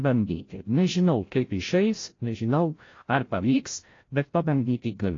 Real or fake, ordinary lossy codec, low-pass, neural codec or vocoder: fake; AAC, 32 kbps; 7.2 kHz; codec, 16 kHz, 0.5 kbps, FreqCodec, larger model